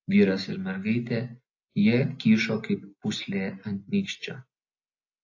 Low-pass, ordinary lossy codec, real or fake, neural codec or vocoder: 7.2 kHz; AAC, 48 kbps; real; none